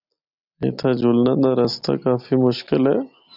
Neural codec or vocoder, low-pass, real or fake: none; 5.4 kHz; real